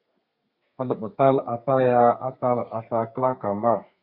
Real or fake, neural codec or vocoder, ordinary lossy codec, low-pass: fake; codec, 32 kHz, 1.9 kbps, SNAC; Opus, 64 kbps; 5.4 kHz